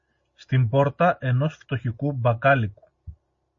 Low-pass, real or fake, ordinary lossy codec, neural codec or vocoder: 7.2 kHz; real; MP3, 32 kbps; none